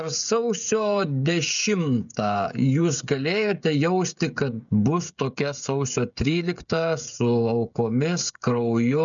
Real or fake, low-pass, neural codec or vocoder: fake; 7.2 kHz; codec, 16 kHz, 16 kbps, FreqCodec, smaller model